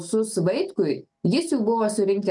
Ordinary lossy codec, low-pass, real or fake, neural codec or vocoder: MP3, 96 kbps; 10.8 kHz; real; none